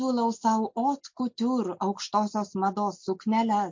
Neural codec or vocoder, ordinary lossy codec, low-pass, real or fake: none; MP3, 48 kbps; 7.2 kHz; real